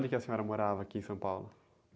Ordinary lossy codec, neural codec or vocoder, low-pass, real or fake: none; none; none; real